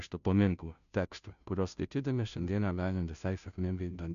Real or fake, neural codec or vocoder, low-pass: fake; codec, 16 kHz, 0.5 kbps, FunCodec, trained on Chinese and English, 25 frames a second; 7.2 kHz